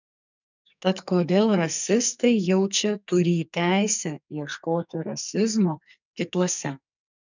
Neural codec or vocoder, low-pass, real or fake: codec, 44.1 kHz, 2.6 kbps, SNAC; 7.2 kHz; fake